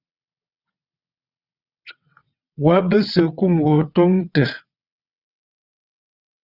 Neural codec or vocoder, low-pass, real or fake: vocoder, 22.05 kHz, 80 mel bands, WaveNeXt; 5.4 kHz; fake